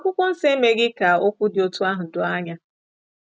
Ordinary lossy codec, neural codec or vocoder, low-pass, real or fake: none; none; none; real